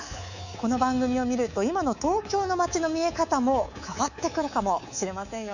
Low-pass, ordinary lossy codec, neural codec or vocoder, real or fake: 7.2 kHz; none; codec, 24 kHz, 3.1 kbps, DualCodec; fake